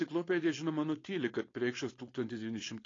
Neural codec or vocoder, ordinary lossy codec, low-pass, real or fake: codec, 16 kHz, 4.8 kbps, FACodec; AAC, 32 kbps; 7.2 kHz; fake